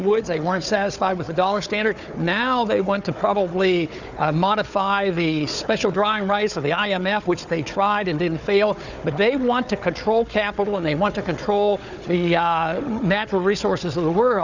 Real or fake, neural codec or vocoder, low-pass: fake; codec, 16 kHz, 4 kbps, FunCodec, trained on Chinese and English, 50 frames a second; 7.2 kHz